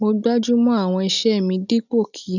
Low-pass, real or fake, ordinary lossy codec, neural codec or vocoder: 7.2 kHz; real; none; none